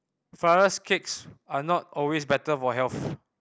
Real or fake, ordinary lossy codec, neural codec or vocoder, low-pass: real; none; none; none